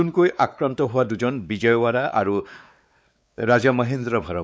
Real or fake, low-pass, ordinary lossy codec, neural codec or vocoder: fake; none; none; codec, 16 kHz, 4 kbps, X-Codec, WavLM features, trained on Multilingual LibriSpeech